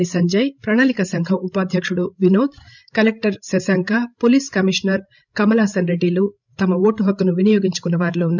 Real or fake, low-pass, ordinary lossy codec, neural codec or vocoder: fake; 7.2 kHz; none; codec, 16 kHz, 16 kbps, FreqCodec, larger model